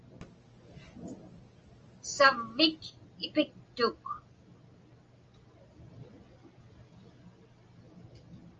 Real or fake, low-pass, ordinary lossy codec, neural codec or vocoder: real; 7.2 kHz; Opus, 24 kbps; none